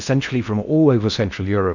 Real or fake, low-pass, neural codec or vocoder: fake; 7.2 kHz; codec, 16 kHz in and 24 kHz out, 0.6 kbps, FocalCodec, streaming, 4096 codes